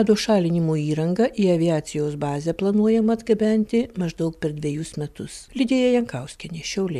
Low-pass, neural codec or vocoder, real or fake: 14.4 kHz; none; real